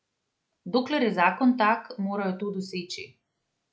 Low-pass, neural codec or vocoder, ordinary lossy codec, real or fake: none; none; none; real